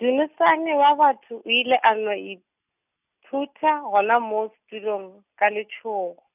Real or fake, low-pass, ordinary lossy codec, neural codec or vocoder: real; 3.6 kHz; none; none